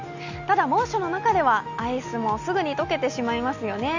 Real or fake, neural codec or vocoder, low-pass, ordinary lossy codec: real; none; 7.2 kHz; Opus, 64 kbps